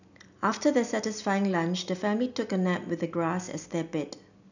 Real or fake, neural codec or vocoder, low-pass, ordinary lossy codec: real; none; 7.2 kHz; none